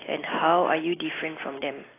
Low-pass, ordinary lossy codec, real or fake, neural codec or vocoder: 3.6 kHz; AAC, 16 kbps; real; none